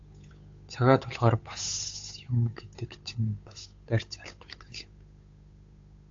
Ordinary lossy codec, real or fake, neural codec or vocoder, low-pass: AAC, 48 kbps; fake; codec, 16 kHz, 8 kbps, FunCodec, trained on Chinese and English, 25 frames a second; 7.2 kHz